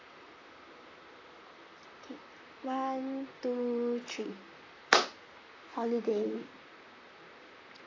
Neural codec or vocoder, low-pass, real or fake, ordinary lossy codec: vocoder, 44.1 kHz, 128 mel bands, Pupu-Vocoder; 7.2 kHz; fake; AAC, 32 kbps